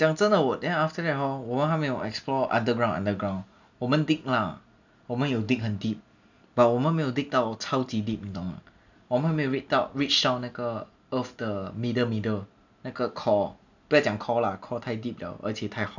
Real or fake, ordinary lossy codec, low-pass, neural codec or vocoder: real; none; 7.2 kHz; none